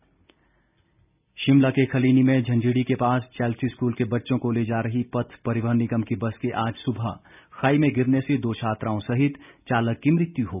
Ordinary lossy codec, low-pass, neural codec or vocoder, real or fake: none; 3.6 kHz; none; real